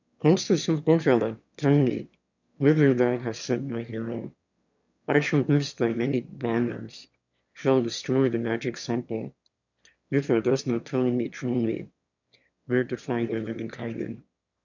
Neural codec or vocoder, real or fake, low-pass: autoencoder, 22.05 kHz, a latent of 192 numbers a frame, VITS, trained on one speaker; fake; 7.2 kHz